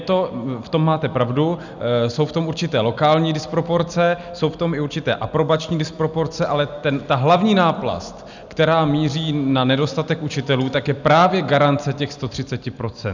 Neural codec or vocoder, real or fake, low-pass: none; real; 7.2 kHz